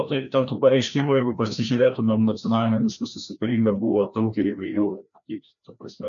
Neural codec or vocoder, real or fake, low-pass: codec, 16 kHz, 1 kbps, FreqCodec, larger model; fake; 7.2 kHz